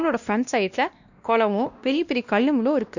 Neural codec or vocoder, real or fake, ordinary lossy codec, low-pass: codec, 16 kHz, 1 kbps, X-Codec, WavLM features, trained on Multilingual LibriSpeech; fake; none; 7.2 kHz